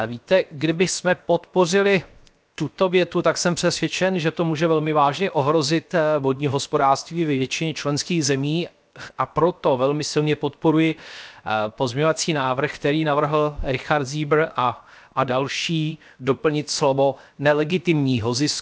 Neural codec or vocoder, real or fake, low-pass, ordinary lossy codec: codec, 16 kHz, 0.7 kbps, FocalCodec; fake; none; none